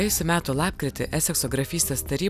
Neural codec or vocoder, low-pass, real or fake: none; 14.4 kHz; real